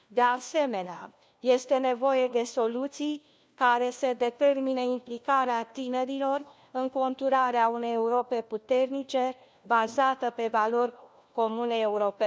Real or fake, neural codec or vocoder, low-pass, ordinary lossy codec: fake; codec, 16 kHz, 1 kbps, FunCodec, trained on LibriTTS, 50 frames a second; none; none